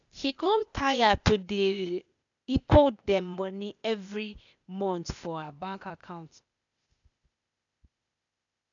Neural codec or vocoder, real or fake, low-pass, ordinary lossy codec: codec, 16 kHz, 0.8 kbps, ZipCodec; fake; 7.2 kHz; none